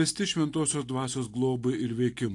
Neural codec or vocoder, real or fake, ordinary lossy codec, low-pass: none; real; AAC, 48 kbps; 10.8 kHz